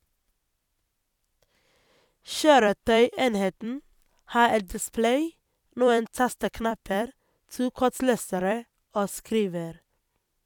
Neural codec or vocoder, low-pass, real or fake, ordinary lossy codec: vocoder, 44.1 kHz, 128 mel bands every 256 samples, BigVGAN v2; 19.8 kHz; fake; none